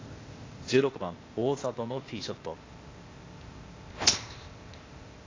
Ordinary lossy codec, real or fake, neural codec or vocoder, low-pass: AAC, 32 kbps; fake; codec, 16 kHz, 0.8 kbps, ZipCodec; 7.2 kHz